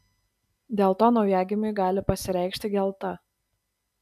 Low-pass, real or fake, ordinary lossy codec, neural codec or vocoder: 14.4 kHz; real; MP3, 96 kbps; none